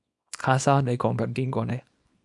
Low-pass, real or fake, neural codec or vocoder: 10.8 kHz; fake; codec, 24 kHz, 0.9 kbps, WavTokenizer, small release